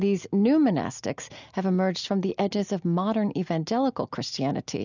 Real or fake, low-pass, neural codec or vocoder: real; 7.2 kHz; none